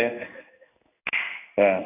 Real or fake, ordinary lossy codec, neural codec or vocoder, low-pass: fake; none; codec, 16 kHz in and 24 kHz out, 1 kbps, XY-Tokenizer; 3.6 kHz